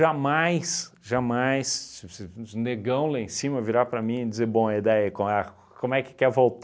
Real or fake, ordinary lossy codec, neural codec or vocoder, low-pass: real; none; none; none